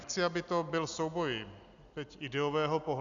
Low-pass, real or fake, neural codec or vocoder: 7.2 kHz; real; none